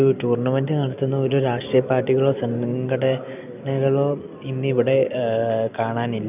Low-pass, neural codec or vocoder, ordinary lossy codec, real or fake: 3.6 kHz; none; none; real